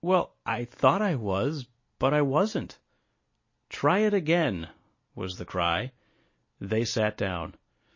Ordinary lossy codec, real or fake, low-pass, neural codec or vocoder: MP3, 32 kbps; real; 7.2 kHz; none